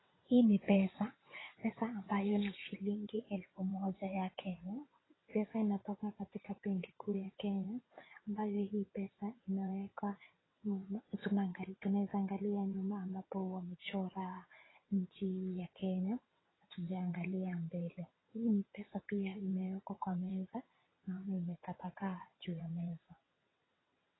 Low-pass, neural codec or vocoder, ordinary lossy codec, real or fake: 7.2 kHz; vocoder, 22.05 kHz, 80 mel bands, WaveNeXt; AAC, 16 kbps; fake